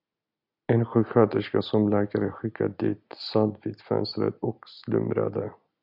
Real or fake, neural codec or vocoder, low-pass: real; none; 5.4 kHz